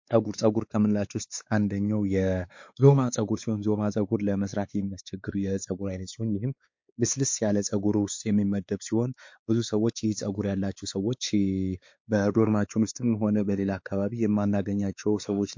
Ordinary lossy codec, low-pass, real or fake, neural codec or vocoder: MP3, 48 kbps; 7.2 kHz; fake; codec, 16 kHz, 4 kbps, X-Codec, WavLM features, trained on Multilingual LibriSpeech